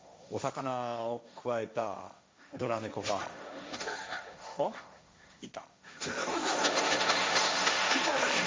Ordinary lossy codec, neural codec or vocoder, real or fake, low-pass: none; codec, 16 kHz, 1.1 kbps, Voila-Tokenizer; fake; none